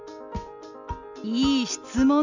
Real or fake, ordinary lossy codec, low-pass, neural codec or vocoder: real; none; 7.2 kHz; none